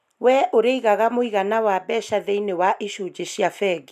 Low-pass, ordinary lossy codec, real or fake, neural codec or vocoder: 14.4 kHz; none; real; none